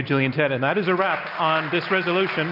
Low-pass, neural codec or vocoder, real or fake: 5.4 kHz; none; real